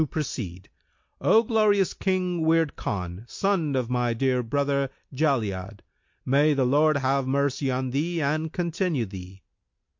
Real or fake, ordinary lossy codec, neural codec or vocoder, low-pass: real; MP3, 48 kbps; none; 7.2 kHz